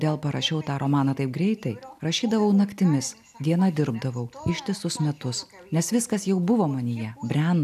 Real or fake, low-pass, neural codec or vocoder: real; 14.4 kHz; none